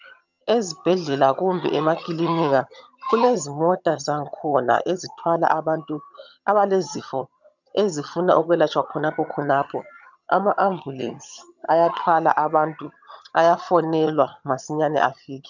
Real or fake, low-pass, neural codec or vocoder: fake; 7.2 kHz; vocoder, 22.05 kHz, 80 mel bands, HiFi-GAN